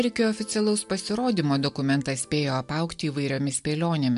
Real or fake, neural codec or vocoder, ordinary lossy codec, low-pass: real; none; MP3, 64 kbps; 10.8 kHz